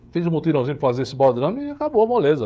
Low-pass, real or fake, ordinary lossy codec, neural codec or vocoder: none; fake; none; codec, 16 kHz, 16 kbps, FreqCodec, smaller model